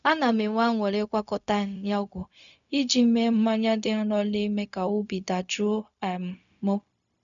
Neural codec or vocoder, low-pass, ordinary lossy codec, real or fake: codec, 16 kHz, 0.4 kbps, LongCat-Audio-Codec; 7.2 kHz; none; fake